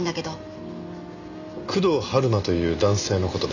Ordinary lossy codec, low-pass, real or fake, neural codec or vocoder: none; 7.2 kHz; real; none